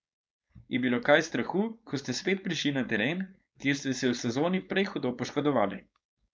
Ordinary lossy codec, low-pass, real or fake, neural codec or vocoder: none; none; fake; codec, 16 kHz, 4.8 kbps, FACodec